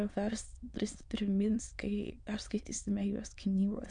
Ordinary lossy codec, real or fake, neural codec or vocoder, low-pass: MP3, 64 kbps; fake; autoencoder, 22.05 kHz, a latent of 192 numbers a frame, VITS, trained on many speakers; 9.9 kHz